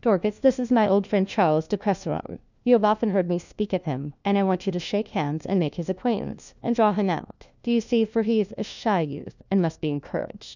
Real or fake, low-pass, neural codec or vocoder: fake; 7.2 kHz; codec, 16 kHz, 1 kbps, FunCodec, trained on LibriTTS, 50 frames a second